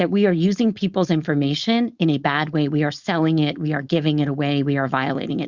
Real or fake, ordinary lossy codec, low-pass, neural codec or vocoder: fake; Opus, 64 kbps; 7.2 kHz; codec, 16 kHz, 4.8 kbps, FACodec